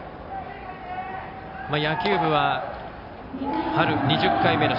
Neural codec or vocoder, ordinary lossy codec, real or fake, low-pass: none; none; real; 5.4 kHz